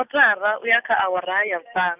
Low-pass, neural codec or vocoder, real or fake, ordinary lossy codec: 3.6 kHz; none; real; none